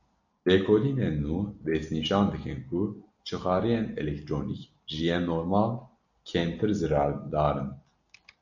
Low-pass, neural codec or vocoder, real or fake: 7.2 kHz; none; real